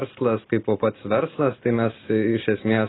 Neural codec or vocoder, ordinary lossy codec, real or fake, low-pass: none; AAC, 16 kbps; real; 7.2 kHz